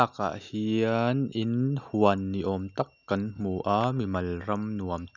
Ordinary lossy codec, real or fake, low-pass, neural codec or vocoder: none; real; 7.2 kHz; none